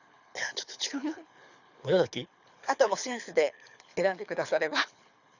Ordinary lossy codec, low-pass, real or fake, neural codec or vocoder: none; 7.2 kHz; fake; codec, 24 kHz, 6 kbps, HILCodec